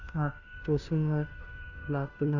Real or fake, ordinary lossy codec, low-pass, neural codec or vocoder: fake; none; 7.2 kHz; codec, 16 kHz, 0.5 kbps, FunCodec, trained on Chinese and English, 25 frames a second